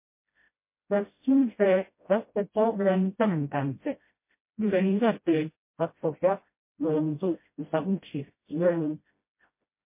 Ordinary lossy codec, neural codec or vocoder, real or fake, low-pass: MP3, 24 kbps; codec, 16 kHz, 0.5 kbps, FreqCodec, smaller model; fake; 3.6 kHz